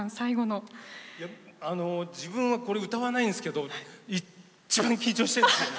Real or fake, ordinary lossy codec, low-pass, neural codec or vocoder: real; none; none; none